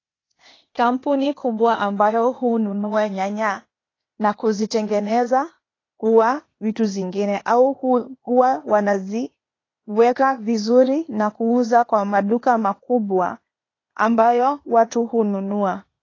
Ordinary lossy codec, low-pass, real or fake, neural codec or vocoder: AAC, 32 kbps; 7.2 kHz; fake; codec, 16 kHz, 0.8 kbps, ZipCodec